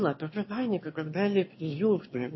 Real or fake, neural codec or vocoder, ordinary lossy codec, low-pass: fake; autoencoder, 22.05 kHz, a latent of 192 numbers a frame, VITS, trained on one speaker; MP3, 24 kbps; 7.2 kHz